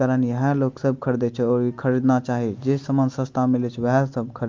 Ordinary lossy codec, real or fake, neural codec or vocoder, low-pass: Opus, 24 kbps; real; none; 7.2 kHz